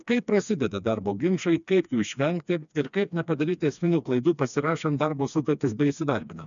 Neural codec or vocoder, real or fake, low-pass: codec, 16 kHz, 2 kbps, FreqCodec, smaller model; fake; 7.2 kHz